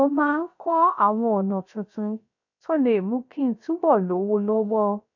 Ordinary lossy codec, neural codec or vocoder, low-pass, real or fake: none; codec, 16 kHz, 0.3 kbps, FocalCodec; 7.2 kHz; fake